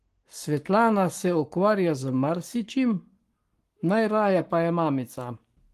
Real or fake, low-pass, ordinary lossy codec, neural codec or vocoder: fake; 14.4 kHz; Opus, 24 kbps; codec, 44.1 kHz, 7.8 kbps, Pupu-Codec